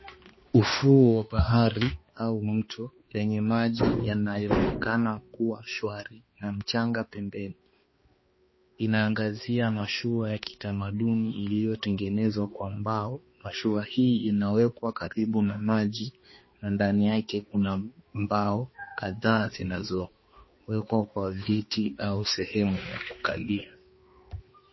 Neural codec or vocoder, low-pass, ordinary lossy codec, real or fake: codec, 16 kHz, 2 kbps, X-Codec, HuBERT features, trained on balanced general audio; 7.2 kHz; MP3, 24 kbps; fake